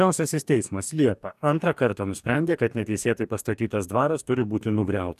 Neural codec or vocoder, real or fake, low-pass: codec, 44.1 kHz, 2.6 kbps, DAC; fake; 14.4 kHz